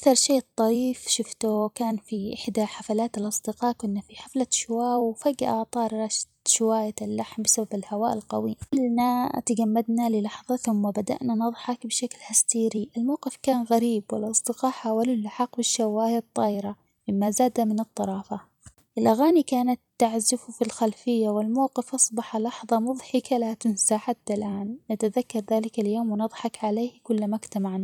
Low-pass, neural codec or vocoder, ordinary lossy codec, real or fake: 19.8 kHz; vocoder, 44.1 kHz, 128 mel bands every 256 samples, BigVGAN v2; none; fake